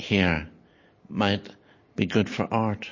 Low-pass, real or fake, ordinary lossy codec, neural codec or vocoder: 7.2 kHz; real; MP3, 32 kbps; none